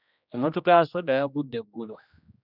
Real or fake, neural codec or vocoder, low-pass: fake; codec, 16 kHz, 1 kbps, X-Codec, HuBERT features, trained on general audio; 5.4 kHz